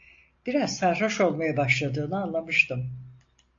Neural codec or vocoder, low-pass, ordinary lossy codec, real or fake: none; 7.2 kHz; AAC, 48 kbps; real